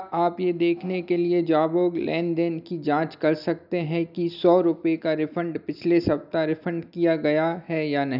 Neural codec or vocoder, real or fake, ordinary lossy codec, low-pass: none; real; none; 5.4 kHz